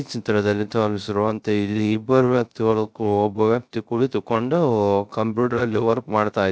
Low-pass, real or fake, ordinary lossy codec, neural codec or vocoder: none; fake; none; codec, 16 kHz, 0.3 kbps, FocalCodec